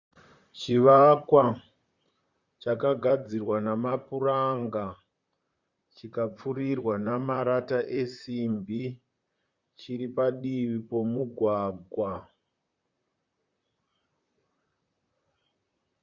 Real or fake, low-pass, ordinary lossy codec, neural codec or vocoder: fake; 7.2 kHz; Opus, 64 kbps; vocoder, 44.1 kHz, 128 mel bands, Pupu-Vocoder